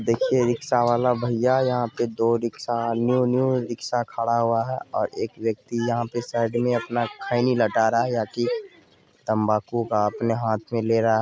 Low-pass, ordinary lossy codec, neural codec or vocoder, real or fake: none; none; none; real